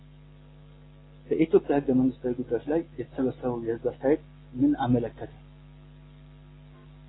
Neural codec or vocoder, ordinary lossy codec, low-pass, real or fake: none; AAC, 16 kbps; 7.2 kHz; real